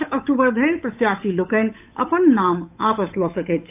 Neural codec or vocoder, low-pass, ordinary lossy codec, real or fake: codec, 16 kHz, 8 kbps, FunCodec, trained on Chinese and English, 25 frames a second; 3.6 kHz; AAC, 32 kbps; fake